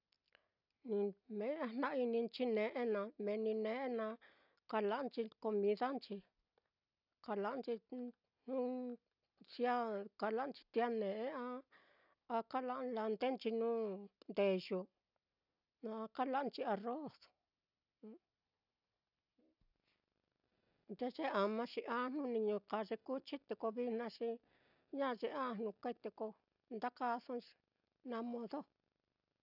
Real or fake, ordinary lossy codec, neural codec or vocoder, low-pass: real; none; none; 5.4 kHz